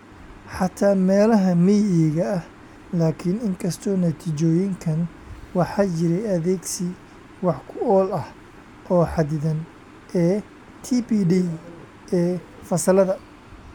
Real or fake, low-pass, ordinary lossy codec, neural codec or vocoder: real; 19.8 kHz; none; none